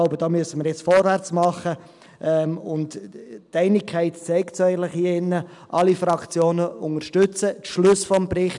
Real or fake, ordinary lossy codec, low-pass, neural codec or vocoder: real; none; 10.8 kHz; none